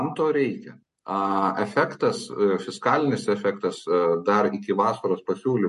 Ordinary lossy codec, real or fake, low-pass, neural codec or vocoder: MP3, 48 kbps; real; 14.4 kHz; none